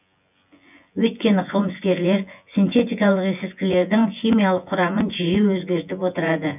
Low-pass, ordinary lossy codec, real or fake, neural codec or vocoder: 3.6 kHz; none; fake; vocoder, 24 kHz, 100 mel bands, Vocos